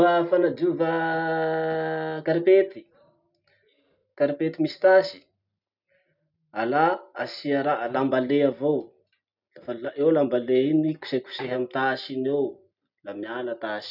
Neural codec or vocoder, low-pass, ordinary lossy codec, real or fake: none; 5.4 kHz; none; real